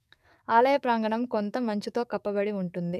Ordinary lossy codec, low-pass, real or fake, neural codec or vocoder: none; 14.4 kHz; fake; codec, 44.1 kHz, 7.8 kbps, DAC